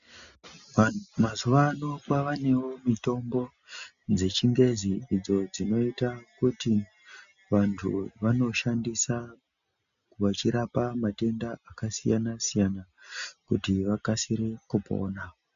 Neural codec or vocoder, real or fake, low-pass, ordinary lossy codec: none; real; 7.2 kHz; MP3, 96 kbps